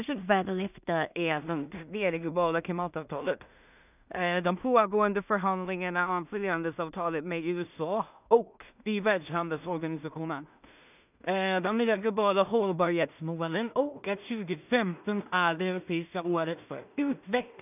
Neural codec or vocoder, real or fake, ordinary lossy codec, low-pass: codec, 16 kHz in and 24 kHz out, 0.4 kbps, LongCat-Audio-Codec, two codebook decoder; fake; none; 3.6 kHz